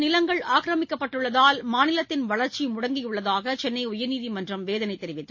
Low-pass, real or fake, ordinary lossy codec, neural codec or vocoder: 7.2 kHz; real; MP3, 32 kbps; none